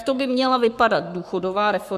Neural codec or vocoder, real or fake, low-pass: codec, 44.1 kHz, 7.8 kbps, Pupu-Codec; fake; 14.4 kHz